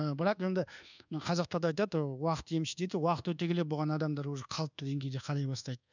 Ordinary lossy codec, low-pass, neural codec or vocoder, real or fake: none; 7.2 kHz; codec, 24 kHz, 1.2 kbps, DualCodec; fake